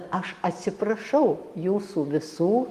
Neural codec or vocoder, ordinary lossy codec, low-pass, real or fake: none; Opus, 24 kbps; 14.4 kHz; real